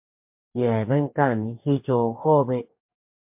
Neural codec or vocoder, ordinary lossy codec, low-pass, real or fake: codec, 44.1 kHz, 2.6 kbps, DAC; MP3, 32 kbps; 3.6 kHz; fake